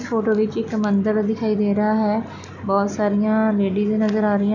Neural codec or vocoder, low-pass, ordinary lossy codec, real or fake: none; 7.2 kHz; none; real